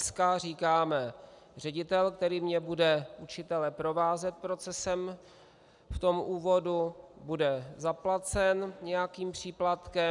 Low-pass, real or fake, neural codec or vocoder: 10.8 kHz; real; none